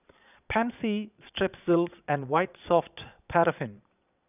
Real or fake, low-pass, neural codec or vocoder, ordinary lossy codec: fake; 3.6 kHz; vocoder, 22.05 kHz, 80 mel bands, Vocos; none